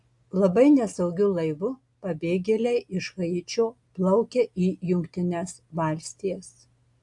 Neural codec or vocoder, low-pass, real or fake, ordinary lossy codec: none; 10.8 kHz; real; AAC, 64 kbps